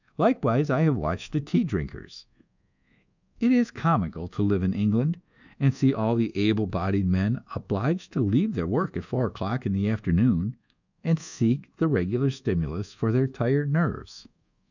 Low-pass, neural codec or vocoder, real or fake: 7.2 kHz; codec, 24 kHz, 1.2 kbps, DualCodec; fake